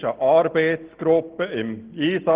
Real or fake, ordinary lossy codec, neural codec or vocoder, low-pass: real; Opus, 16 kbps; none; 3.6 kHz